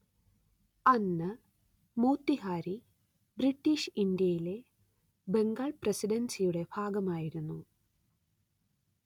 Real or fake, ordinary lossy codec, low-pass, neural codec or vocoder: real; none; 19.8 kHz; none